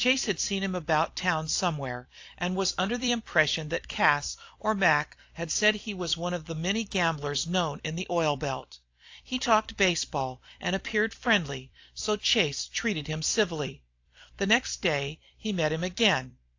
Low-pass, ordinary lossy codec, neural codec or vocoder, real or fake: 7.2 kHz; AAC, 48 kbps; vocoder, 44.1 kHz, 128 mel bands every 256 samples, BigVGAN v2; fake